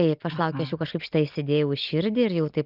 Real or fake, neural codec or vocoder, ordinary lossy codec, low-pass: real; none; Opus, 24 kbps; 5.4 kHz